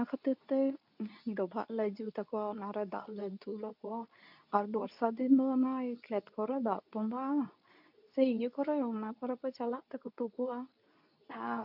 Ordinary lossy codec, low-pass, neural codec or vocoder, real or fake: none; 5.4 kHz; codec, 24 kHz, 0.9 kbps, WavTokenizer, medium speech release version 2; fake